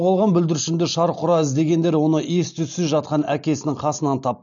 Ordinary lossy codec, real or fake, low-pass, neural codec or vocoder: none; real; 7.2 kHz; none